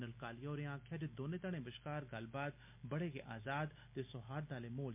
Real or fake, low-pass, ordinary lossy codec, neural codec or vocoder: real; 3.6 kHz; MP3, 32 kbps; none